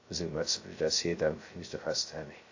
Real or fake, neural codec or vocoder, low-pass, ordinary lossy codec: fake; codec, 16 kHz, 0.2 kbps, FocalCodec; 7.2 kHz; AAC, 48 kbps